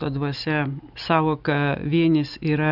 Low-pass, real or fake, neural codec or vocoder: 5.4 kHz; real; none